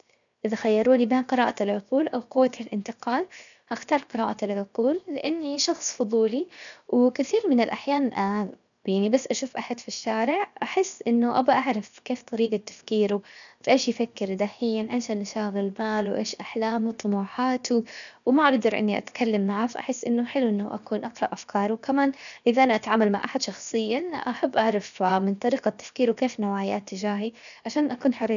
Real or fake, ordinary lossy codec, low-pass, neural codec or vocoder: fake; none; 7.2 kHz; codec, 16 kHz, 0.7 kbps, FocalCodec